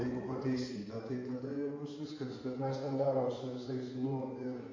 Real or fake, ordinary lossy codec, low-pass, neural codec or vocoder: fake; MP3, 48 kbps; 7.2 kHz; codec, 16 kHz in and 24 kHz out, 2.2 kbps, FireRedTTS-2 codec